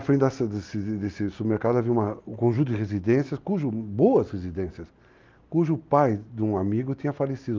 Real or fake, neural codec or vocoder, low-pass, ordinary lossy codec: real; none; 7.2 kHz; Opus, 32 kbps